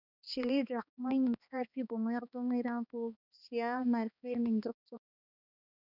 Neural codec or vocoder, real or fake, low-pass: codec, 16 kHz, 2 kbps, X-Codec, HuBERT features, trained on balanced general audio; fake; 5.4 kHz